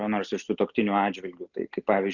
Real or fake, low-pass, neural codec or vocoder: real; 7.2 kHz; none